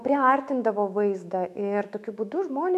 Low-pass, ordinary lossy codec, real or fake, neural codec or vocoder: 14.4 kHz; MP3, 96 kbps; fake; autoencoder, 48 kHz, 128 numbers a frame, DAC-VAE, trained on Japanese speech